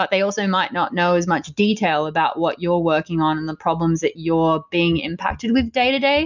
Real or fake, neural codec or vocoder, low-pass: real; none; 7.2 kHz